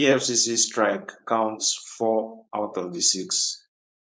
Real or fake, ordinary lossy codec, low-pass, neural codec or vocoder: fake; none; none; codec, 16 kHz, 4.8 kbps, FACodec